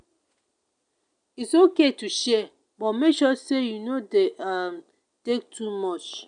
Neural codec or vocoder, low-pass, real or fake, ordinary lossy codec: none; 9.9 kHz; real; none